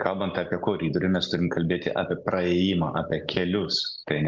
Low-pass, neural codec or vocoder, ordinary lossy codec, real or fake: 7.2 kHz; none; Opus, 32 kbps; real